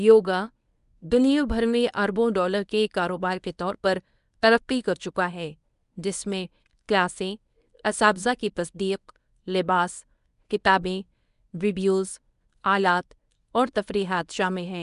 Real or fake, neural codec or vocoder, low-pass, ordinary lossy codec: fake; codec, 24 kHz, 0.9 kbps, WavTokenizer, medium speech release version 1; 10.8 kHz; none